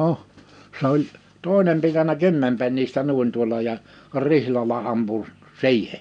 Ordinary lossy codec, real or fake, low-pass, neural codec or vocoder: none; real; 9.9 kHz; none